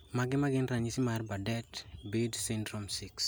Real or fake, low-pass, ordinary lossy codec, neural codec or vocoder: real; none; none; none